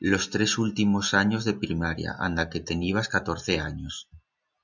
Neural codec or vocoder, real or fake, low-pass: none; real; 7.2 kHz